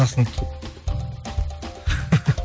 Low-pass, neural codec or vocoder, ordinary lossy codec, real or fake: none; none; none; real